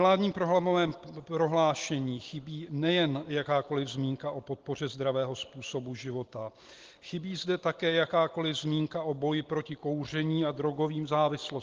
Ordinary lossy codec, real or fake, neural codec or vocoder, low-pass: Opus, 16 kbps; real; none; 7.2 kHz